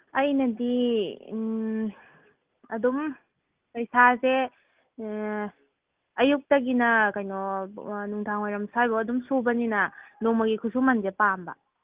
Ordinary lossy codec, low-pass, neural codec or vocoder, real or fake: Opus, 32 kbps; 3.6 kHz; none; real